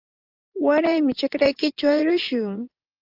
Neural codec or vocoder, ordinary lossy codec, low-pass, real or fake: vocoder, 24 kHz, 100 mel bands, Vocos; Opus, 32 kbps; 5.4 kHz; fake